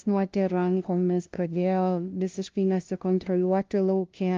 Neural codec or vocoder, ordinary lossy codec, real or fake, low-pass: codec, 16 kHz, 0.5 kbps, FunCodec, trained on LibriTTS, 25 frames a second; Opus, 32 kbps; fake; 7.2 kHz